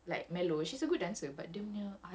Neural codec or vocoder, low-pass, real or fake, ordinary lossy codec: none; none; real; none